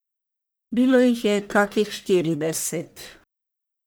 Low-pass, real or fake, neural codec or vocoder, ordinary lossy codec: none; fake; codec, 44.1 kHz, 1.7 kbps, Pupu-Codec; none